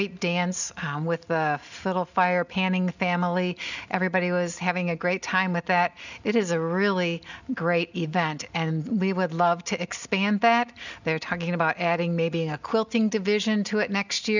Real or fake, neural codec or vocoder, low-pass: real; none; 7.2 kHz